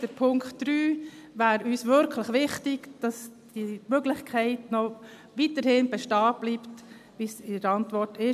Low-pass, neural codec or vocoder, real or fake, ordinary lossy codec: 14.4 kHz; none; real; MP3, 96 kbps